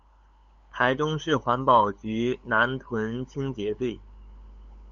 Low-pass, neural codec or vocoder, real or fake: 7.2 kHz; codec, 16 kHz, 8 kbps, FunCodec, trained on LibriTTS, 25 frames a second; fake